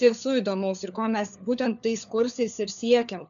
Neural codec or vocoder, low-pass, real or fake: codec, 16 kHz, 4 kbps, FunCodec, trained on LibriTTS, 50 frames a second; 7.2 kHz; fake